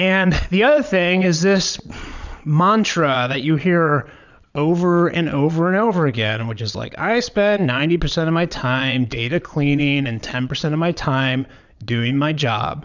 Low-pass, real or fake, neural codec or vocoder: 7.2 kHz; fake; vocoder, 22.05 kHz, 80 mel bands, Vocos